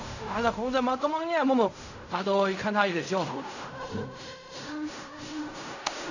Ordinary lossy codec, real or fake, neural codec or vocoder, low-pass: none; fake; codec, 16 kHz in and 24 kHz out, 0.4 kbps, LongCat-Audio-Codec, fine tuned four codebook decoder; 7.2 kHz